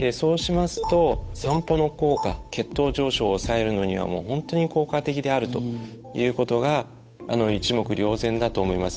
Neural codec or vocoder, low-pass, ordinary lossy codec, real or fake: codec, 16 kHz, 8 kbps, FunCodec, trained on Chinese and English, 25 frames a second; none; none; fake